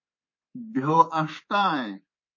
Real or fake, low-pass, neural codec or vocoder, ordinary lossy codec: fake; 7.2 kHz; codec, 24 kHz, 3.1 kbps, DualCodec; MP3, 32 kbps